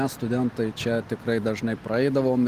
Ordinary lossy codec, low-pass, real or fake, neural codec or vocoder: Opus, 24 kbps; 14.4 kHz; real; none